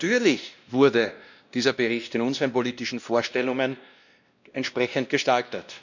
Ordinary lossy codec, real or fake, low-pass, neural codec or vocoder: none; fake; 7.2 kHz; codec, 16 kHz, 1 kbps, X-Codec, WavLM features, trained on Multilingual LibriSpeech